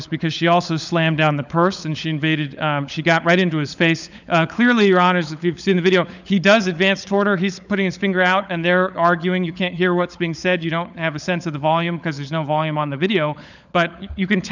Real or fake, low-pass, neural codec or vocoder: fake; 7.2 kHz; codec, 16 kHz, 16 kbps, FunCodec, trained on LibriTTS, 50 frames a second